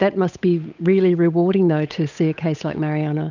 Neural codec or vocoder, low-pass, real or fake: codec, 16 kHz, 8 kbps, FunCodec, trained on Chinese and English, 25 frames a second; 7.2 kHz; fake